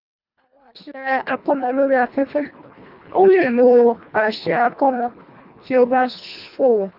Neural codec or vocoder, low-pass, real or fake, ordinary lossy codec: codec, 24 kHz, 1.5 kbps, HILCodec; 5.4 kHz; fake; none